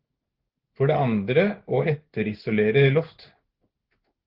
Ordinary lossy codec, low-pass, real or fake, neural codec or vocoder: Opus, 16 kbps; 5.4 kHz; fake; codec, 16 kHz in and 24 kHz out, 1 kbps, XY-Tokenizer